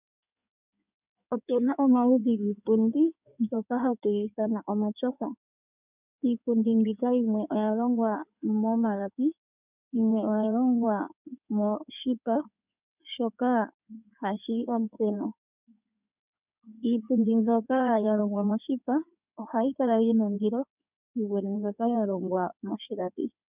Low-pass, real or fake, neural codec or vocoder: 3.6 kHz; fake; codec, 16 kHz in and 24 kHz out, 2.2 kbps, FireRedTTS-2 codec